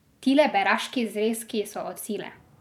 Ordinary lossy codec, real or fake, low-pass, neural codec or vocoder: none; fake; 19.8 kHz; vocoder, 44.1 kHz, 128 mel bands every 512 samples, BigVGAN v2